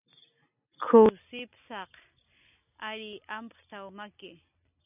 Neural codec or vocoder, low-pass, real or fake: none; 3.6 kHz; real